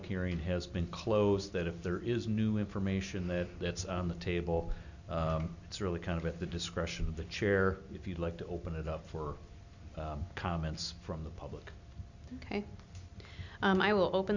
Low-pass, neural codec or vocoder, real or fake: 7.2 kHz; none; real